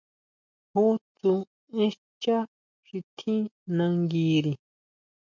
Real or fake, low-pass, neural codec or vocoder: real; 7.2 kHz; none